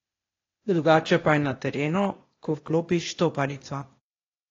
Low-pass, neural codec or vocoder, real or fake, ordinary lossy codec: 7.2 kHz; codec, 16 kHz, 0.8 kbps, ZipCodec; fake; AAC, 32 kbps